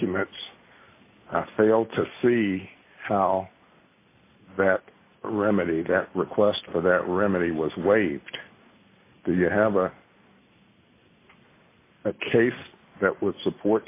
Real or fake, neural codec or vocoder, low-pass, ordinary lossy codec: real; none; 3.6 kHz; AAC, 24 kbps